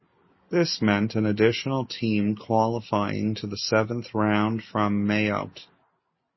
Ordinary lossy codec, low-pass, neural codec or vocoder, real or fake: MP3, 24 kbps; 7.2 kHz; none; real